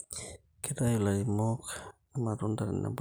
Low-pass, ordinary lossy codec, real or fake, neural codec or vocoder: none; none; real; none